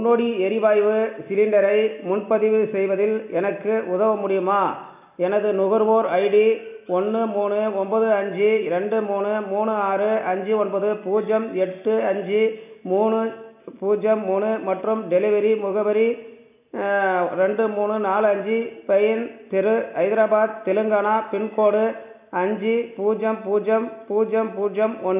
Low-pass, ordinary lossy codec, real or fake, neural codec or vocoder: 3.6 kHz; none; real; none